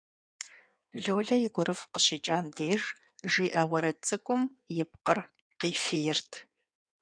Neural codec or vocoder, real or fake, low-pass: codec, 16 kHz in and 24 kHz out, 1.1 kbps, FireRedTTS-2 codec; fake; 9.9 kHz